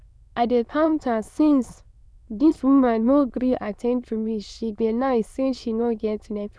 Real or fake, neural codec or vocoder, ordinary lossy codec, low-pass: fake; autoencoder, 22.05 kHz, a latent of 192 numbers a frame, VITS, trained on many speakers; none; none